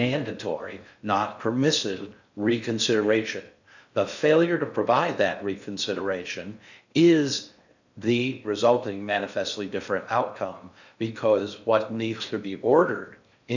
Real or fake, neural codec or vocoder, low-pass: fake; codec, 16 kHz in and 24 kHz out, 0.6 kbps, FocalCodec, streaming, 4096 codes; 7.2 kHz